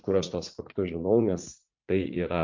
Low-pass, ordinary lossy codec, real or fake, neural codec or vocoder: 7.2 kHz; MP3, 48 kbps; fake; vocoder, 44.1 kHz, 80 mel bands, Vocos